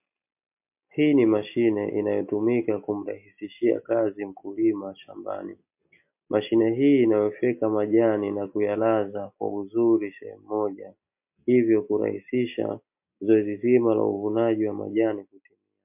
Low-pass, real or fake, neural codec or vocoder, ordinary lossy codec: 3.6 kHz; real; none; MP3, 32 kbps